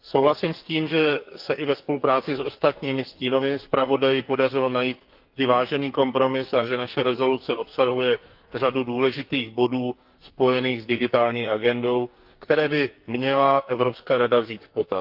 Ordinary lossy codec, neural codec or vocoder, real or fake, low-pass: Opus, 32 kbps; codec, 44.1 kHz, 2.6 kbps, SNAC; fake; 5.4 kHz